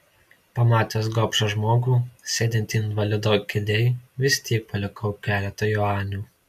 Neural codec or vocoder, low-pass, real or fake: none; 14.4 kHz; real